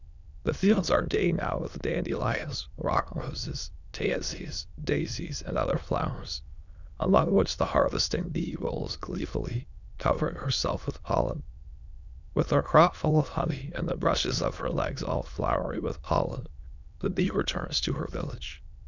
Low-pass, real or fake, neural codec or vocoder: 7.2 kHz; fake; autoencoder, 22.05 kHz, a latent of 192 numbers a frame, VITS, trained on many speakers